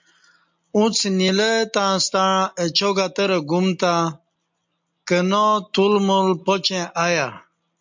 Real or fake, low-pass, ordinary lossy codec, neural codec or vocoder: real; 7.2 kHz; MP3, 64 kbps; none